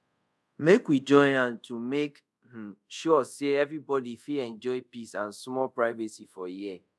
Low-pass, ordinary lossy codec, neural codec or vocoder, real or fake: none; none; codec, 24 kHz, 0.5 kbps, DualCodec; fake